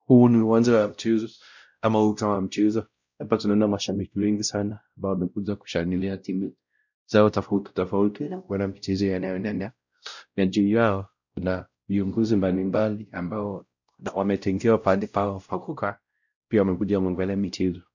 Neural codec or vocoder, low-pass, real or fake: codec, 16 kHz, 0.5 kbps, X-Codec, WavLM features, trained on Multilingual LibriSpeech; 7.2 kHz; fake